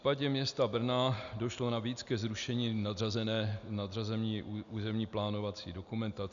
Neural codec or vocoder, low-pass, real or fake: none; 7.2 kHz; real